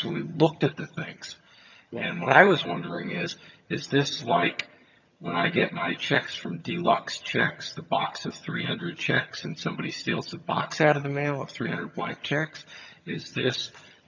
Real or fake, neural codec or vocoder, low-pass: fake; vocoder, 22.05 kHz, 80 mel bands, HiFi-GAN; 7.2 kHz